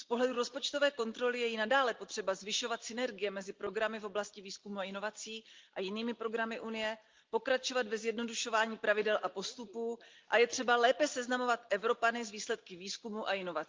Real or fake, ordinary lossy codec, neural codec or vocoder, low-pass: real; Opus, 32 kbps; none; 7.2 kHz